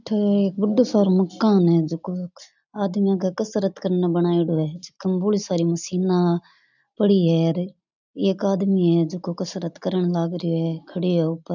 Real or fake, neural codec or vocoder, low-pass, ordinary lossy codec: real; none; 7.2 kHz; none